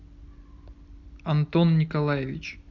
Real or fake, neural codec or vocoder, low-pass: real; none; 7.2 kHz